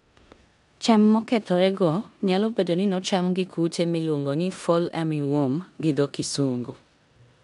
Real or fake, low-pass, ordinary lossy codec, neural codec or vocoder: fake; 10.8 kHz; none; codec, 16 kHz in and 24 kHz out, 0.9 kbps, LongCat-Audio-Codec, four codebook decoder